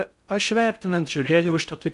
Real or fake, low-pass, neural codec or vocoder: fake; 10.8 kHz; codec, 16 kHz in and 24 kHz out, 0.6 kbps, FocalCodec, streaming, 4096 codes